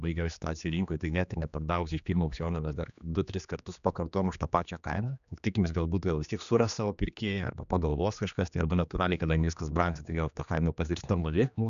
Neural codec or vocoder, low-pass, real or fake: codec, 16 kHz, 2 kbps, X-Codec, HuBERT features, trained on general audio; 7.2 kHz; fake